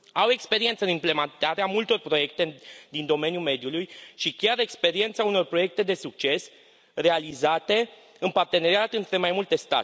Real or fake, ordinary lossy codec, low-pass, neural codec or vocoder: real; none; none; none